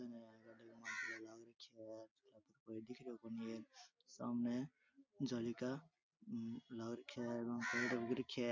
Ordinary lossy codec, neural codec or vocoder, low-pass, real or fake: MP3, 64 kbps; none; 7.2 kHz; real